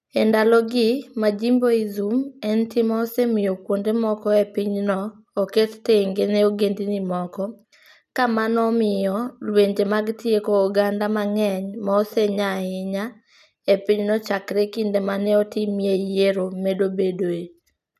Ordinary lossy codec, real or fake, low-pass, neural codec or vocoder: none; real; 14.4 kHz; none